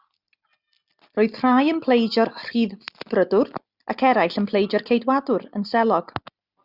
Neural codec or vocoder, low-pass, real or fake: none; 5.4 kHz; real